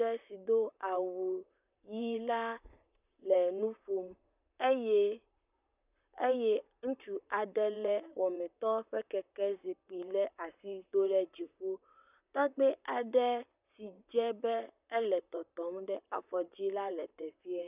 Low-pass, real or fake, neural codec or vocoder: 3.6 kHz; fake; vocoder, 44.1 kHz, 128 mel bands, Pupu-Vocoder